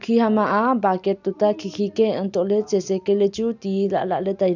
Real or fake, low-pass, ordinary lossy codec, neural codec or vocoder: real; 7.2 kHz; none; none